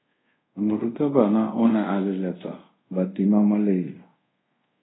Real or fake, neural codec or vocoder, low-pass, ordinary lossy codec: fake; codec, 24 kHz, 0.5 kbps, DualCodec; 7.2 kHz; AAC, 16 kbps